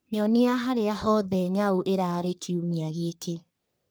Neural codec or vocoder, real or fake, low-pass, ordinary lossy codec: codec, 44.1 kHz, 3.4 kbps, Pupu-Codec; fake; none; none